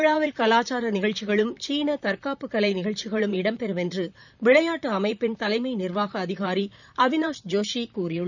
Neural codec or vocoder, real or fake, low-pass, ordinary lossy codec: vocoder, 44.1 kHz, 128 mel bands, Pupu-Vocoder; fake; 7.2 kHz; none